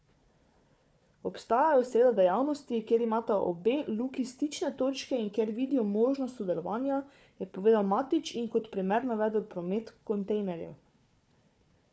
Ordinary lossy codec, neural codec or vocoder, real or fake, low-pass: none; codec, 16 kHz, 4 kbps, FunCodec, trained on Chinese and English, 50 frames a second; fake; none